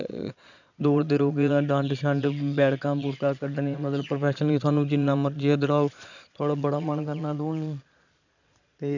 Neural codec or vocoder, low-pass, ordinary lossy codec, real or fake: vocoder, 22.05 kHz, 80 mel bands, Vocos; 7.2 kHz; none; fake